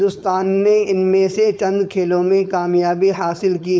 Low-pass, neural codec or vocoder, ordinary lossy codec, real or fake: none; codec, 16 kHz, 4.8 kbps, FACodec; none; fake